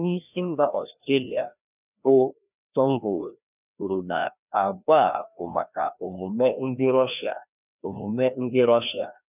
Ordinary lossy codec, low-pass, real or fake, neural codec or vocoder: none; 3.6 kHz; fake; codec, 16 kHz, 1 kbps, FreqCodec, larger model